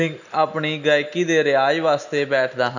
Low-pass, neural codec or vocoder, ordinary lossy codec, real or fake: 7.2 kHz; none; none; real